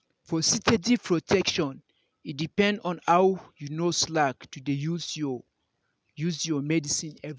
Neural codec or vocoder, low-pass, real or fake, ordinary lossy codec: none; none; real; none